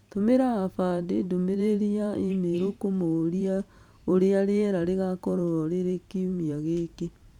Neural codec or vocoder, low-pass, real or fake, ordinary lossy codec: vocoder, 44.1 kHz, 128 mel bands every 512 samples, BigVGAN v2; 19.8 kHz; fake; none